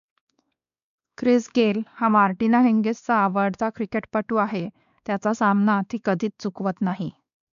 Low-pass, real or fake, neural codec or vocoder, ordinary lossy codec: 7.2 kHz; fake; codec, 16 kHz, 2 kbps, X-Codec, WavLM features, trained on Multilingual LibriSpeech; none